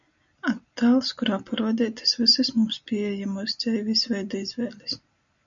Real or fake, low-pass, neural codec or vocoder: real; 7.2 kHz; none